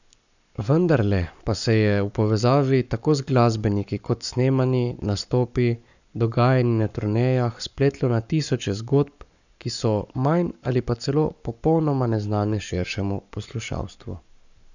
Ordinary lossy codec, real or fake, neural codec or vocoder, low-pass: none; fake; codec, 44.1 kHz, 7.8 kbps, Pupu-Codec; 7.2 kHz